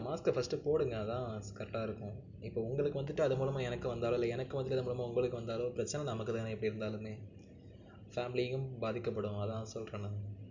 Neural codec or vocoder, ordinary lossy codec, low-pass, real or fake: none; none; 7.2 kHz; real